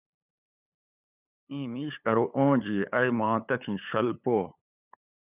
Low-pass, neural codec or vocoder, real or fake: 3.6 kHz; codec, 16 kHz, 8 kbps, FunCodec, trained on LibriTTS, 25 frames a second; fake